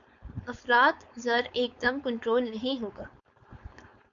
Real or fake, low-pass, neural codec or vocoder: fake; 7.2 kHz; codec, 16 kHz, 4.8 kbps, FACodec